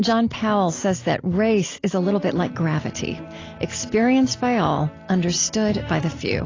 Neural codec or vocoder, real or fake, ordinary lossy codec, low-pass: none; real; AAC, 32 kbps; 7.2 kHz